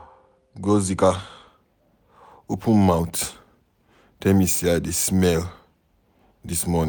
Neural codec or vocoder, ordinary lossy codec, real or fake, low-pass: none; none; real; none